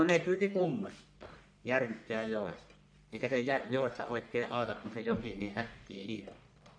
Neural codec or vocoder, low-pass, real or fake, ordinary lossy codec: codec, 44.1 kHz, 1.7 kbps, Pupu-Codec; 9.9 kHz; fake; none